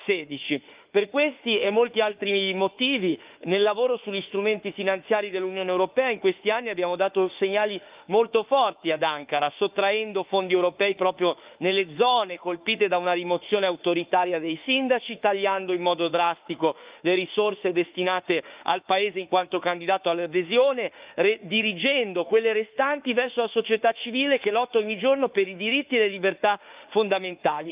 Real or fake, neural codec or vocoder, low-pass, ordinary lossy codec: fake; autoencoder, 48 kHz, 32 numbers a frame, DAC-VAE, trained on Japanese speech; 3.6 kHz; Opus, 24 kbps